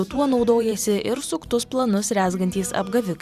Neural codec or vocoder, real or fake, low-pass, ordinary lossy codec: vocoder, 44.1 kHz, 128 mel bands every 512 samples, BigVGAN v2; fake; 14.4 kHz; Opus, 64 kbps